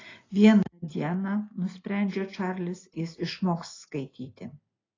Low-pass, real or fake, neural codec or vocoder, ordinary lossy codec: 7.2 kHz; real; none; AAC, 32 kbps